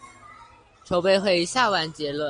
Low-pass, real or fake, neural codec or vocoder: 9.9 kHz; real; none